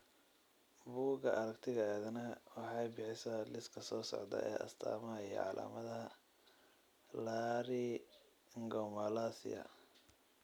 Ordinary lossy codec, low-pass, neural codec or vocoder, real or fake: none; none; none; real